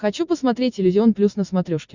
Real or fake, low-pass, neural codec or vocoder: real; 7.2 kHz; none